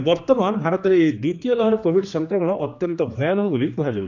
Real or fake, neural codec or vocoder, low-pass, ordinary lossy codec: fake; codec, 16 kHz, 2 kbps, X-Codec, HuBERT features, trained on general audio; 7.2 kHz; none